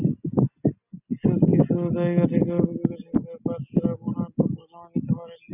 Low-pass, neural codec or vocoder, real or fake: 3.6 kHz; none; real